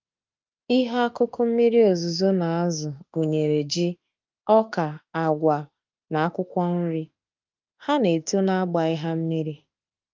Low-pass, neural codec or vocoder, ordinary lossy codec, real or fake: 7.2 kHz; autoencoder, 48 kHz, 32 numbers a frame, DAC-VAE, trained on Japanese speech; Opus, 32 kbps; fake